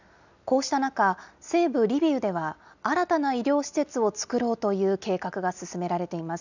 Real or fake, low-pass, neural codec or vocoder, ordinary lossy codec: real; 7.2 kHz; none; none